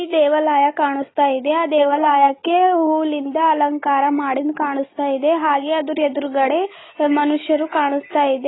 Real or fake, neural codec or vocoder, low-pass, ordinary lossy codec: real; none; 7.2 kHz; AAC, 16 kbps